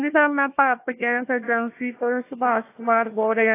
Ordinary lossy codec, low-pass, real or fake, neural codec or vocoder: AAC, 24 kbps; 3.6 kHz; fake; codec, 16 kHz, 1 kbps, FunCodec, trained on Chinese and English, 50 frames a second